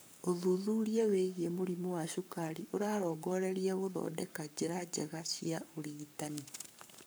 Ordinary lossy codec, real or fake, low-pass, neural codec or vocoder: none; fake; none; codec, 44.1 kHz, 7.8 kbps, Pupu-Codec